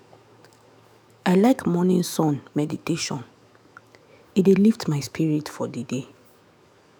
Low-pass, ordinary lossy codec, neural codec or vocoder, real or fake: none; none; autoencoder, 48 kHz, 128 numbers a frame, DAC-VAE, trained on Japanese speech; fake